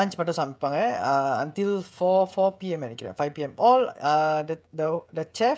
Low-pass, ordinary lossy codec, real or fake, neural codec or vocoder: none; none; fake; codec, 16 kHz, 16 kbps, FreqCodec, smaller model